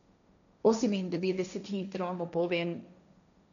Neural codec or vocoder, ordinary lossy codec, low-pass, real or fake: codec, 16 kHz, 1.1 kbps, Voila-Tokenizer; none; 7.2 kHz; fake